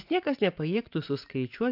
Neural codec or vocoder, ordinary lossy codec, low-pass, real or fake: vocoder, 24 kHz, 100 mel bands, Vocos; AAC, 48 kbps; 5.4 kHz; fake